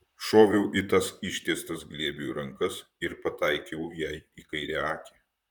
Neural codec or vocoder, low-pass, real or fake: vocoder, 44.1 kHz, 128 mel bands, Pupu-Vocoder; 19.8 kHz; fake